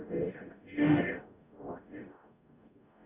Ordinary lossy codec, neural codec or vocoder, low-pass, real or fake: Opus, 64 kbps; codec, 44.1 kHz, 0.9 kbps, DAC; 3.6 kHz; fake